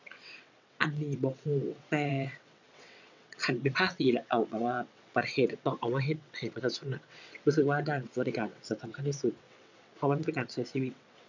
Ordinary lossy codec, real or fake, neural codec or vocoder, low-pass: none; fake; vocoder, 22.05 kHz, 80 mel bands, WaveNeXt; 7.2 kHz